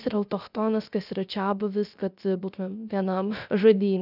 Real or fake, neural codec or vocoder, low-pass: fake; codec, 16 kHz, 0.3 kbps, FocalCodec; 5.4 kHz